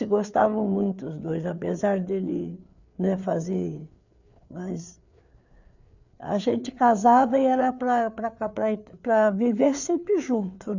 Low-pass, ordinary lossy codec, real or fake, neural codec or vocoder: 7.2 kHz; none; fake; codec, 16 kHz, 4 kbps, FreqCodec, larger model